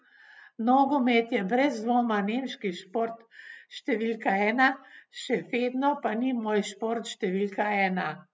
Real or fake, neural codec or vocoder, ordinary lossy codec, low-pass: real; none; none; none